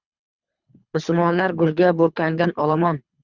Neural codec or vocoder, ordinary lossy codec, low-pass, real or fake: codec, 24 kHz, 3 kbps, HILCodec; Opus, 64 kbps; 7.2 kHz; fake